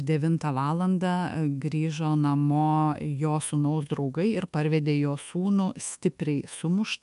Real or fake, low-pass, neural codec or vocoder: fake; 10.8 kHz; codec, 24 kHz, 1.2 kbps, DualCodec